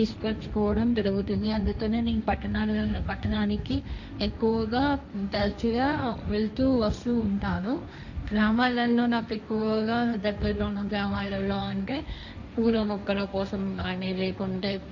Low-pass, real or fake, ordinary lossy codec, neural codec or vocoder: 7.2 kHz; fake; none; codec, 16 kHz, 1.1 kbps, Voila-Tokenizer